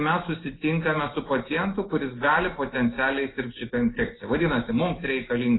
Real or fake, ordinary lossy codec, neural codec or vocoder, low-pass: real; AAC, 16 kbps; none; 7.2 kHz